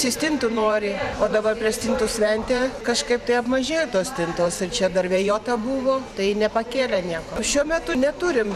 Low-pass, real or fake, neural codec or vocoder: 14.4 kHz; fake; vocoder, 44.1 kHz, 128 mel bands every 512 samples, BigVGAN v2